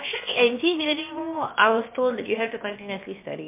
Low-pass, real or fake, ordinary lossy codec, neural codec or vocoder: 3.6 kHz; fake; MP3, 32 kbps; codec, 16 kHz, about 1 kbps, DyCAST, with the encoder's durations